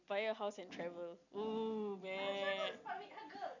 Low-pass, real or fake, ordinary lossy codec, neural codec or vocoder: 7.2 kHz; real; none; none